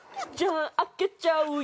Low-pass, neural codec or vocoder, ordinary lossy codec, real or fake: none; none; none; real